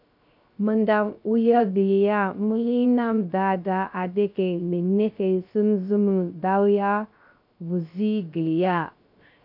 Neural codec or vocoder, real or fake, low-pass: codec, 16 kHz, 0.3 kbps, FocalCodec; fake; 5.4 kHz